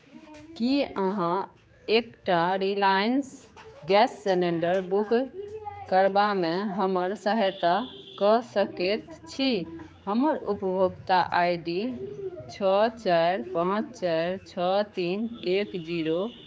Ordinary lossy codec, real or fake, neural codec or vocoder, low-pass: none; fake; codec, 16 kHz, 4 kbps, X-Codec, HuBERT features, trained on general audio; none